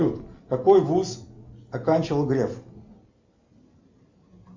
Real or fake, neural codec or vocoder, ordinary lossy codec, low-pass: real; none; MP3, 64 kbps; 7.2 kHz